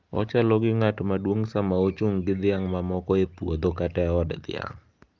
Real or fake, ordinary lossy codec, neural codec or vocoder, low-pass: real; Opus, 32 kbps; none; 7.2 kHz